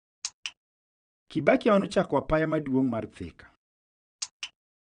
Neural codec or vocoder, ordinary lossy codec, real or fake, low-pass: vocoder, 22.05 kHz, 80 mel bands, Vocos; none; fake; 9.9 kHz